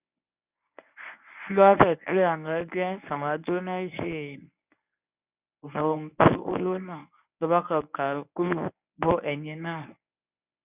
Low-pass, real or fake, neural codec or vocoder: 3.6 kHz; fake; codec, 24 kHz, 0.9 kbps, WavTokenizer, medium speech release version 1